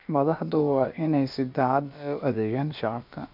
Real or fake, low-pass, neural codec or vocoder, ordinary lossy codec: fake; 5.4 kHz; codec, 16 kHz, about 1 kbps, DyCAST, with the encoder's durations; MP3, 48 kbps